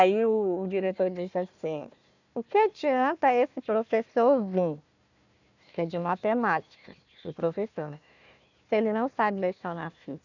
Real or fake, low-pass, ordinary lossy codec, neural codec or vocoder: fake; 7.2 kHz; none; codec, 16 kHz, 1 kbps, FunCodec, trained on Chinese and English, 50 frames a second